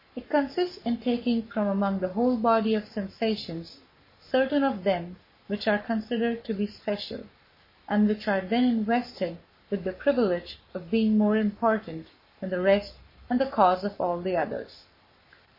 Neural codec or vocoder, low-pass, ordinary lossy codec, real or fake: codec, 44.1 kHz, 7.8 kbps, Pupu-Codec; 5.4 kHz; MP3, 24 kbps; fake